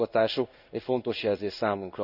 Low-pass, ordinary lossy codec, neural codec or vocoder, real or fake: 5.4 kHz; none; codec, 16 kHz in and 24 kHz out, 1 kbps, XY-Tokenizer; fake